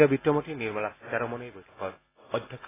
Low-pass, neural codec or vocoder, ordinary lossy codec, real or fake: 3.6 kHz; none; AAC, 16 kbps; real